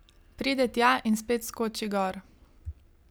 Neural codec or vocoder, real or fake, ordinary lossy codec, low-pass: none; real; none; none